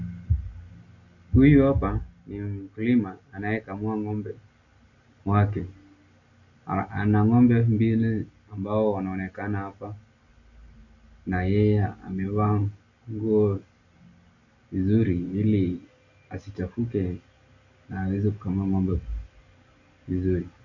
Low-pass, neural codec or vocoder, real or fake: 7.2 kHz; none; real